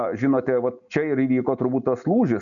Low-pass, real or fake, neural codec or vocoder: 7.2 kHz; real; none